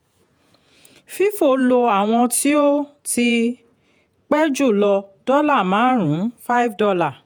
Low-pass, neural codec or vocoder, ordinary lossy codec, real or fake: none; vocoder, 48 kHz, 128 mel bands, Vocos; none; fake